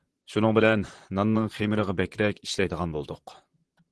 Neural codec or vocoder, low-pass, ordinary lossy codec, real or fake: vocoder, 44.1 kHz, 128 mel bands, Pupu-Vocoder; 10.8 kHz; Opus, 16 kbps; fake